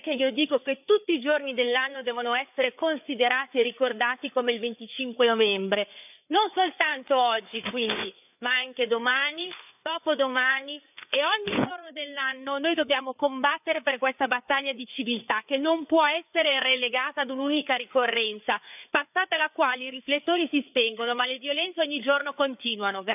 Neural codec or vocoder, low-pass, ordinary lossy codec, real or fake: codec, 16 kHz, 4 kbps, FreqCodec, larger model; 3.6 kHz; none; fake